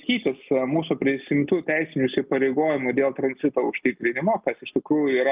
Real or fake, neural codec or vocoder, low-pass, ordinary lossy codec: real; none; 3.6 kHz; Opus, 24 kbps